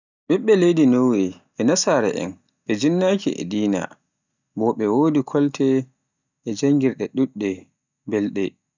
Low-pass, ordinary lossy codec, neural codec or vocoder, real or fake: 7.2 kHz; none; none; real